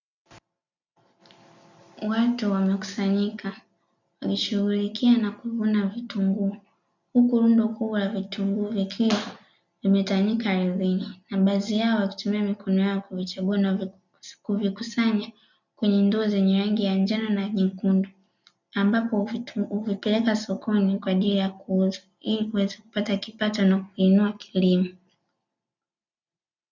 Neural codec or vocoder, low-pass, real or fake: none; 7.2 kHz; real